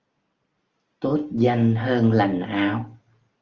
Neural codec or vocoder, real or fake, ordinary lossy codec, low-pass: none; real; Opus, 32 kbps; 7.2 kHz